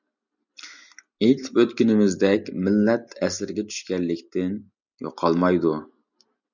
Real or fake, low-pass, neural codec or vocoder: real; 7.2 kHz; none